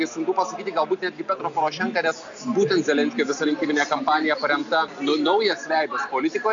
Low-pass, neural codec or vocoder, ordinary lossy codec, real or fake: 7.2 kHz; none; MP3, 64 kbps; real